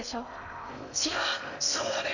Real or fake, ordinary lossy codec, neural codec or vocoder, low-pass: fake; none; codec, 16 kHz in and 24 kHz out, 0.8 kbps, FocalCodec, streaming, 65536 codes; 7.2 kHz